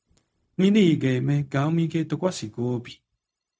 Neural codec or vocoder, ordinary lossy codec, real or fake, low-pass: codec, 16 kHz, 0.4 kbps, LongCat-Audio-Codec; none; fake; none